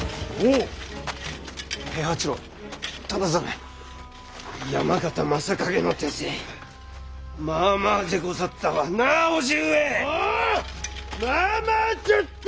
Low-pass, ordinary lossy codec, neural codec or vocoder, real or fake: none; none; none; real